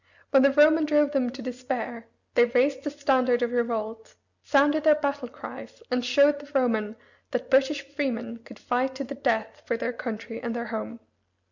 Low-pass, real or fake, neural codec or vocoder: 7.2 kHz; real; none